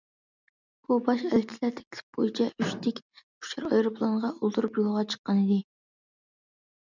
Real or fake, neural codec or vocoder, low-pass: real; none; 7.2 kHz